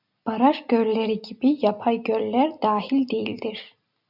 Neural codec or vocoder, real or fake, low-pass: none; real; 5.4 kHz